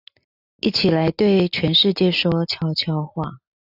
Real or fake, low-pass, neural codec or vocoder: real; 5.4 kHz; none